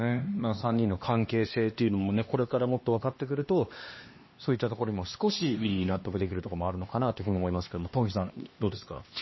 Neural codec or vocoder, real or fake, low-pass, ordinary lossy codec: codec, 16 kHz, 2 kbps, X-Codec, HuBERT features, trained on LibriSpeech; fake; 7.2 kHz; MP3, 24 kbps